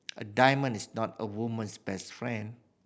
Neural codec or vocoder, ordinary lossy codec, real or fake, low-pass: none; none; real; none